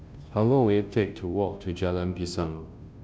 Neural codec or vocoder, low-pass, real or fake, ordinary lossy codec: codec, 16 kHz, 0.5 kbps, FunCodec, trained on Chinese and English, 25 frames a second; none; fake; none